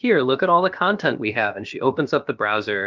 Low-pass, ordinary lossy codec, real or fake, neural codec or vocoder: 7.2 kHz; Opus, 24 kbps; fake; codec, 16 kHz, about 1 kbps, DyCAST, with the encoder's durations